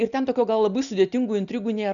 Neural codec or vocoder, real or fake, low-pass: none; real; 7.2 kHz